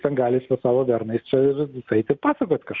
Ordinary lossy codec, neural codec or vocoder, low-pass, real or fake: Opus, 64 kbps; none; 7.2 kHz; real